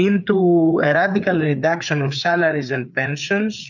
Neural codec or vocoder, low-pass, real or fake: codec, 16 kHz, 4 kbps, FreqCodec, larger model; 7.2 kHz; fake